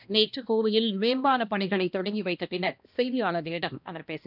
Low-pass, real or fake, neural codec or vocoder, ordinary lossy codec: 5.4 kHz; fake; codec, 16 kHz, 1 kbps, X-Codec, HuBERT features, trained on balanced general audio; none